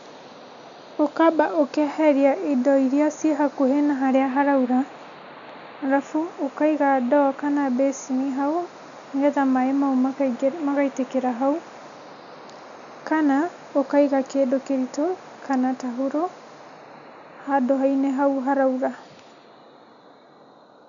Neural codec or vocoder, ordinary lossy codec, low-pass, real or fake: none; none; 7.2 kHz; real